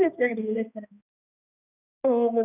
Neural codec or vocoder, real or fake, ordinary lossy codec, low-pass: codec, 16 kHz, 1 kbps, X-Codec, HuBERT features, trained on balanced general audio; fake; none; 3.6 kHz